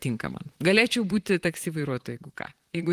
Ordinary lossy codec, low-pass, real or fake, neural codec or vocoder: Opus, 24 kbps; 14.4 kHz; fake; vocoder, 44.1 kHz, 128 mel bands, Pupu-Vocoder